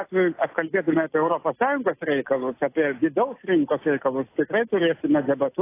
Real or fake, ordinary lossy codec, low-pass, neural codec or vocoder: real; AAC, 24 kbps; 3.6 kHz; none